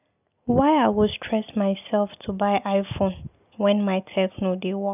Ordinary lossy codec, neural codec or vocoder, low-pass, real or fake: none; none; 3.6 kHz; real